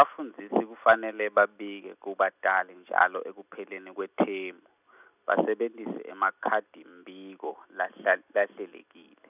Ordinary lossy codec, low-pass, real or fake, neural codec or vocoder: none; 3.6 kHz; real; none